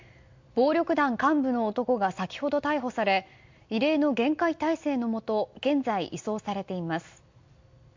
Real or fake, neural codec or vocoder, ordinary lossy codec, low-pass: real; none; MP3, 64 kbps; 7.2 kHz